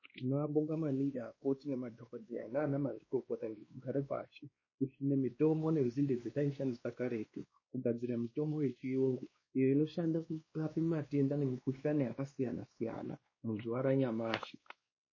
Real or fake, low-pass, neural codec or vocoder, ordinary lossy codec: fake; 7.2 kHz; codec, 16 kHz, 2 kbps, X-Codec, WavLM features, trained on Multilingual LibriSpeech; MP3, 32 kbps